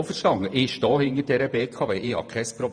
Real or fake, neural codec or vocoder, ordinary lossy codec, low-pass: fake; vocoder, 48 kHz, 128 mel bands, Vocos; none; 9.9 kHz